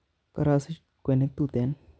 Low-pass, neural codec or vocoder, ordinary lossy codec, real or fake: none; none; none; real